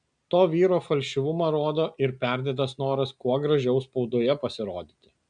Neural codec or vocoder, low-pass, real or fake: none; 9.9 kHz; real